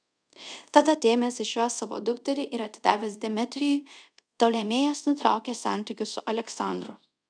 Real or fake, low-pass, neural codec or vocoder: fake; 9.9 kHz; codec, 24 kHz, 0.5 kbps, DualCodec